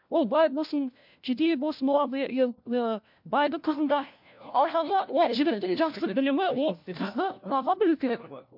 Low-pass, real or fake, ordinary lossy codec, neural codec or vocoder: 5.4 kHz; fake; MP3, 48 kbps; codec, 16 kHz, 1 kbps, FunCodec, trained on LibriTTS, 50 frames a second